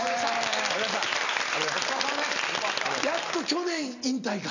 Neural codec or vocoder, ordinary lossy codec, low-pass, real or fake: none; none; 7.2 kHz; real